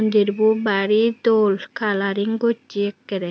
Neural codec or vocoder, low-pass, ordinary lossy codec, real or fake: none; none; none; real